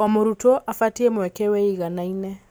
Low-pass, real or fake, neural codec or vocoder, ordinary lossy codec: none; real; none; none